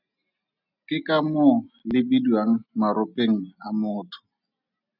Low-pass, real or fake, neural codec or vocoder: 5.4 kHz; real; none